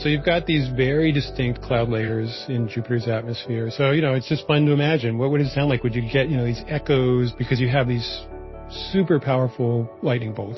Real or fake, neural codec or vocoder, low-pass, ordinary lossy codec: real; none; 7.2 kHz; MP3, 24 kbps